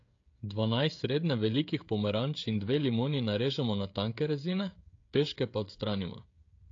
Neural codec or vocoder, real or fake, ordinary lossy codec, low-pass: codec, 16 kHz, 16 kbps, FreqCodec, smaller model; fake; AAC, 48 kbps; 7.2 kHz